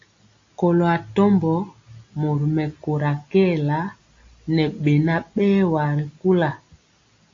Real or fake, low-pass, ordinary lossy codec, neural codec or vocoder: real; 7.2 kHz; AAC, 48 kbps; none